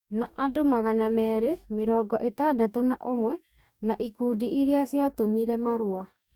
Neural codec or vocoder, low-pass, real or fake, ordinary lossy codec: codec, 44.1 kHz, 2.6 kbps, DAC; 19.8 kHz; fake; none